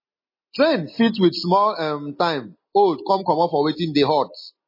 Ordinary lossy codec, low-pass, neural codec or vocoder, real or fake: MP3, 24 kbps; 5.4 kHz; none; real